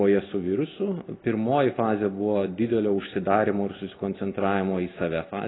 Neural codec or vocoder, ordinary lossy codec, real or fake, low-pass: none; AAC, 16 kbps; real; 7.2 kHz